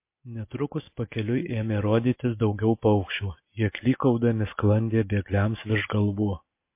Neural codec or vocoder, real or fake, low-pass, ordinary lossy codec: none; real; 3.6 kHz; MP3, 24 kbps